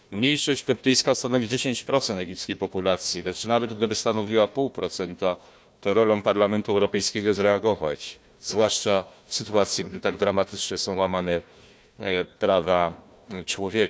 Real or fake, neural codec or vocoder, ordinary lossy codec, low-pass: fake; codec, 16 kHz, 1 kbps, FunCodec, trained on Chinese and English, 50 frames a second; none; none